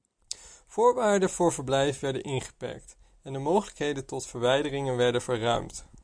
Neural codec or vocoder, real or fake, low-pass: none; real; 9.9 kHz